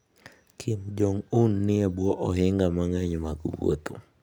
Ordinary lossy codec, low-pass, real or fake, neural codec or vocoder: none; none; real; none